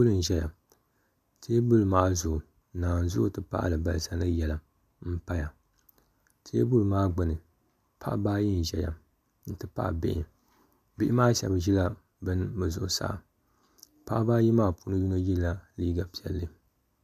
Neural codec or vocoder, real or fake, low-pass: none; real; 14.4 kHz